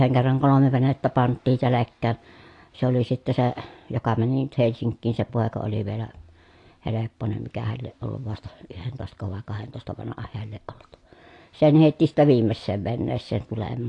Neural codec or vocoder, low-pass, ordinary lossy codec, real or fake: none; 10.8 kHz; AAC, 48 kbps; real